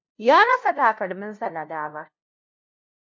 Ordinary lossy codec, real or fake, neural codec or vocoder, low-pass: MP3, 48 kbps; fake; codec, 16 kHz, 0.5 kbps, FunCodec, trained on LibriTTS, 25 frames a second; 7.2 kHz